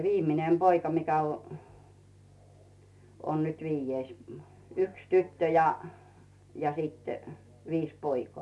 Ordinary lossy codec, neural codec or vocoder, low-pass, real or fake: none; none; none; real